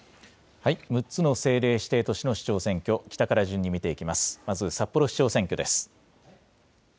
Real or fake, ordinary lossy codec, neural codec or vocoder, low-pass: real; none; none; none